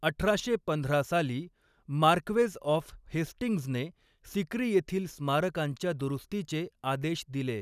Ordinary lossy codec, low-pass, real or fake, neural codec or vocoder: none; 14.4 kHz; real; none